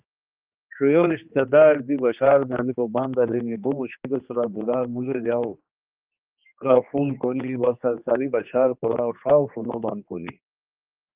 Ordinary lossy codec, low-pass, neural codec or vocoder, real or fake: Opus, 32 kbps; 3.6 kHz; codec, 16 kHz, 2 kbps, X-Codec, HuBERT features, trained on general audio; fake